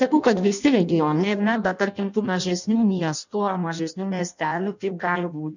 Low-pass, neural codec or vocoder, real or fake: 7.2 kHz; codec, 16 kHz in and 24 kHz out, 0.6 kbps, FireRedTTS-2 codec; fake